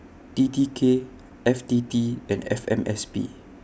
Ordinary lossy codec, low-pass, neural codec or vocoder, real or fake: none; none; none; real